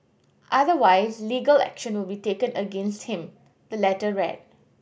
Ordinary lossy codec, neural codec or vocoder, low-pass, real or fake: none; none; none; real